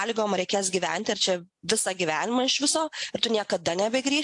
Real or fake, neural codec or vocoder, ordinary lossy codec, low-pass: real; none; AAC, 64 kbps; 10.8 kHz